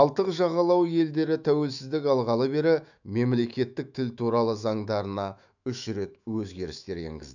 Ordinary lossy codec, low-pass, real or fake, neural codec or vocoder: none; 7.2 kHz; fake; autoencoder, 48 kHz, 128 numbers a frame, DAC-VAE, trained on Japanese speech